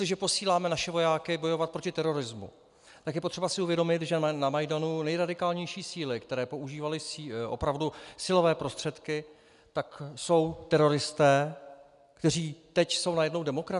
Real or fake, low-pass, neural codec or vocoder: real; 10.8 kHz; none